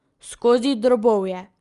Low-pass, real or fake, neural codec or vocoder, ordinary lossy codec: 10.8 kHz; real; none; Opus, 32 kbps